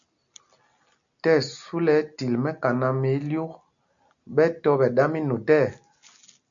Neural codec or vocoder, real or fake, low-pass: none; real; 7.2 kHz